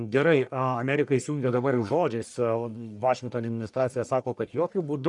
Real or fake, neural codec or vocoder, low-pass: fake; codec, 44.1 kHz, 1.7 kbps, Pupu-Codec; 10.8 kHz